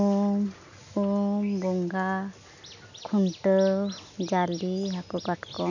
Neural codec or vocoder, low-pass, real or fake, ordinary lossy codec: none; 7.2 kHz; real; none